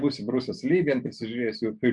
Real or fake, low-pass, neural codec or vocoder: real; 10.8 kHz; none